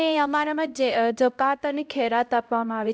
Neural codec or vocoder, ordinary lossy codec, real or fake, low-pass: codec, 16 kHz, 0.5 kbps, X-Codec, HuBERT features, trained on LibriSpeech; none; fake; none